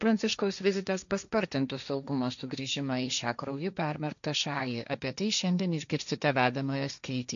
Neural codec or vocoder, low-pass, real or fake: codec, 16 kHz, 1.1 kbps, Voila-Tokenizer; 7.2 kHz; fake